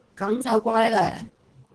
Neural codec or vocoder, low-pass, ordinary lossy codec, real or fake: codec, 24 kHz, 1.5 kbps, HILCodec; 10.8 kHz; Opus, 16 kbps; fake